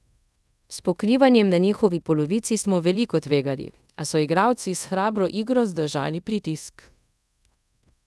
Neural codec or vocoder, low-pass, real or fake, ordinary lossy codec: codec, 24 kHz, 0.5 kbps, DualCodec; none; fake; none